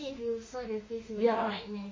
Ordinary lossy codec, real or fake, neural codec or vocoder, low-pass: MP3, 32 kbps; fake; autoencoder, 48 kHz, 32 numbers a frame, DAC-VAE, trained on Japanese speech; 7.2 kHz